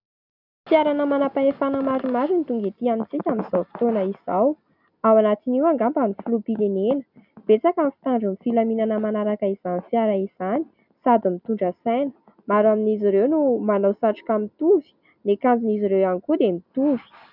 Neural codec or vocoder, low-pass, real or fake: none; 5.4 kHz; real